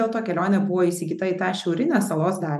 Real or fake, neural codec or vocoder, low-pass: real; none; 14.4 kHz